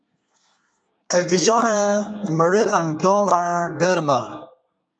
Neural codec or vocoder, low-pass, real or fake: codec, 24 kHz, 1 kbps, SNAC; 9.9 kHz; fake